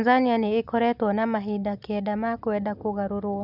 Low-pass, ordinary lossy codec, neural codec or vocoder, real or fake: 5.4 kHz; none; none; real